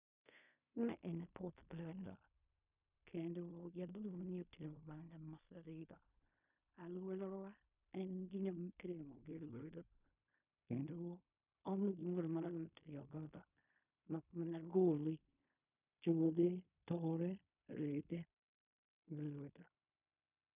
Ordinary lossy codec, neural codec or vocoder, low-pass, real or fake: none; codec, 16 kHz in and 24 kHz out, 0.4 kbps, LongCat-Audio-Codec, fine tuned four codebook decoder; 3.6 kHz; fake